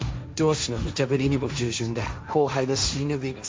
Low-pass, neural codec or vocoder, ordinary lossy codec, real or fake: none; codec, 16 kHz, 1.1 kbps, Voila-Tokenizer; none; fake